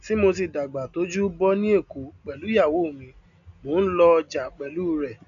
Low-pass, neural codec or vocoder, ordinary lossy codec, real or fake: 7.2 kHz; none; none; real